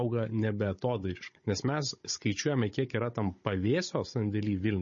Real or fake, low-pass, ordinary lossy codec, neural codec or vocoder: fake; 7.2 kHz; MP3, 32 kbps; codec, 16 kHz, 16 kbps, FunCodec, trained on Chinese and English, 50 frames a second